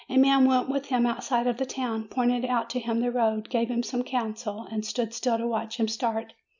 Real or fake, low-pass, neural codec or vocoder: real; 7.2 kHz; none